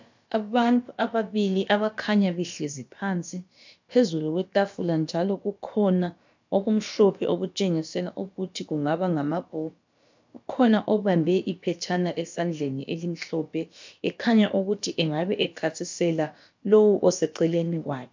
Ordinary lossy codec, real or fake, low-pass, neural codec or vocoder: MP3, 64 kbps; fake; 7.2 kHz; codec, 16 kHz, about 1 kbps, DyCAST, with the encoder's durations